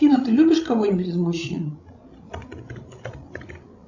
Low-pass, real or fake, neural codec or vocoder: 7.2 kHz; fake; codec, 16 kHz, 16 kbps, FreqCodec, larger model